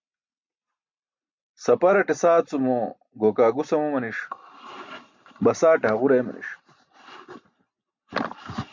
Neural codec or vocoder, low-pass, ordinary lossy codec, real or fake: none; 7.2 kHz; MP3, 64 kbps; real